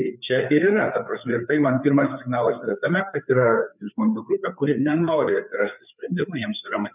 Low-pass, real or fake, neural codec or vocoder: 3.6 kHz; fake; codec, 16 kHz, 4 kbps, FreqCodec, larger model